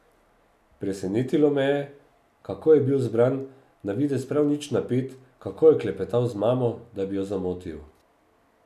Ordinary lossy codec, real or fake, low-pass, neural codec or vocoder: none; real; 14.4 kHz; none